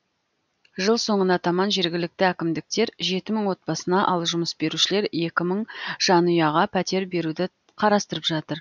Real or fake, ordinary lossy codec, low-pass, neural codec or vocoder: real; none; 7.2 kHz; none